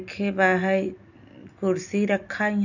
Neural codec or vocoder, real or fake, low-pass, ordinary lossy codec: none; real; 7.2 kHz; none